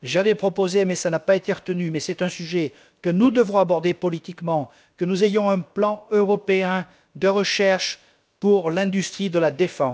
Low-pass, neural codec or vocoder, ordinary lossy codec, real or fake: none; codec, 16 kHz, about 1 kbps, DyCAST, with the encoder's durations; none; fake